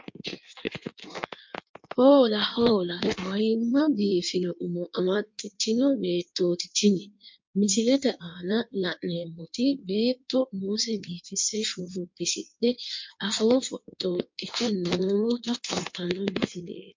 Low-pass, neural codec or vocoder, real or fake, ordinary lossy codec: 7.2 kHz; codec, 16 kHz in and 24 kHz out, 1.1 kbps, FireRedTTS-2 codec; fake; MP3, 48 kbps